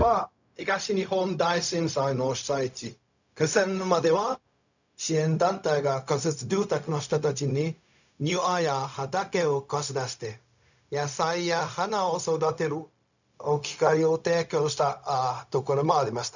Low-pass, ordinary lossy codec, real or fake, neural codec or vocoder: 7.2 kHz; none; fake; codec, 16 kHz, 0.4 kbps, LongCat-Audio-Codec